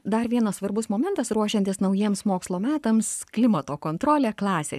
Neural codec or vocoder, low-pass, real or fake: codec, 44.1 kHz, 7.8 kbps, Pupu-Codec; 14.4 kHz; fake